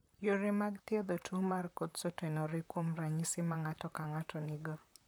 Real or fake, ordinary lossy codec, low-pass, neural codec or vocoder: fake; none; none; vocoder, 44.1 kHz, 128 mel bands, Pupu-Vocoder